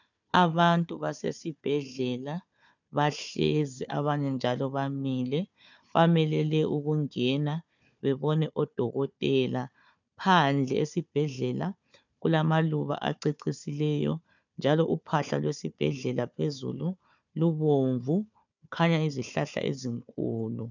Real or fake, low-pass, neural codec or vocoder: fake; 7.2 kHz; codec, 16 kHz, 4 kbps, FunCodec, trained on Chinese and English, 50 frames a second